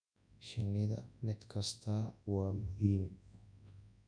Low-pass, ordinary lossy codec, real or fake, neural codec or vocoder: 9.9 kHz; MP3, 96 kbps; fake; codec, 24 kHz, 0.9 kbps, WavTokenizer, large speech release